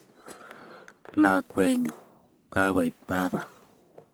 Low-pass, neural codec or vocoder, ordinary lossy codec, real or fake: none; codec, 44.1 kHz, 1.7 kbps, Pupu-Codec; none; fake